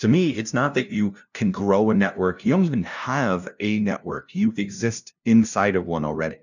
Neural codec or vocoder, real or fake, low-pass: codec, 16 kHz, 0.5 kbps, FunCodec, trained on LibriTTS, 25 frames a second; fake; 7.2 kHz